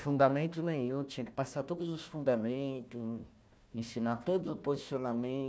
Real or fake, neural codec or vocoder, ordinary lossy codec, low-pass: fake; codec, 16 kHz, 1 kbps, FunCodec, trained on Chinese and English, 50 frames a second; none; none